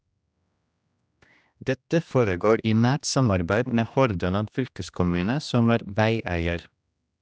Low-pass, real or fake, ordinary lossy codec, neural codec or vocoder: none; fake; none; codec, 16 kHz, 1 kbps, X-Codec, HuBERT features, trained on general audio